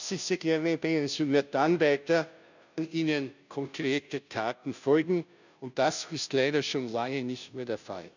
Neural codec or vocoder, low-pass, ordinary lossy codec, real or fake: codec, 16 kHz, 0.5 kbps, FunCodec, trained on Chinese and English, 25 frames a second; 7.2 kHz; none; fake